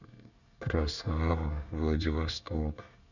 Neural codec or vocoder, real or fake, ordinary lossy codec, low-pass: codec, 24 kHz, 1 kbps, SNAC; fake; none; 7.2 kHz